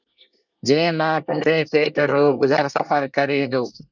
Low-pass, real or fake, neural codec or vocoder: 7.2 kHz; fake; codec, 24 kHz, 1 kbps, SNAC